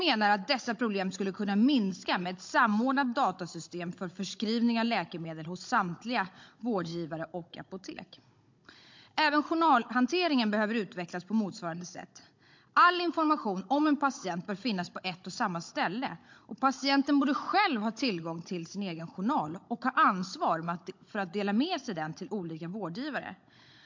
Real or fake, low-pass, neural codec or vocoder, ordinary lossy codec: fake; 7.2 kHz; codec, 16 kHz, 16 kbps, FunCodec, trained on Chinese and English, 50 frames a second; MP3, 48 kbps